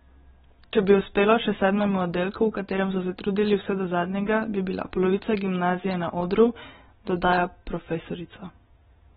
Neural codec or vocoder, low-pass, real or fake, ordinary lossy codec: none; 7.2 kHz; real; AAC, 16 kbps